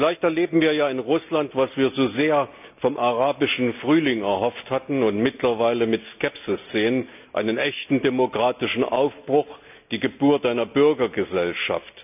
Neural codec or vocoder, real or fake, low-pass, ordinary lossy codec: none; real; 3.6 kHz; none